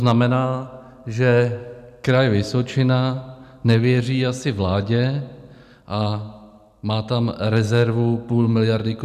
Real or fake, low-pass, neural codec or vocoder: real; 14.4 kHz; none